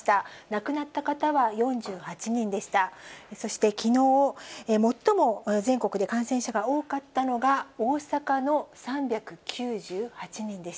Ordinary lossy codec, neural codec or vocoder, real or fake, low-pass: none; none; real; none